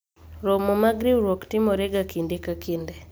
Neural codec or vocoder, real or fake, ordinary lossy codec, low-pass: none; real; none; none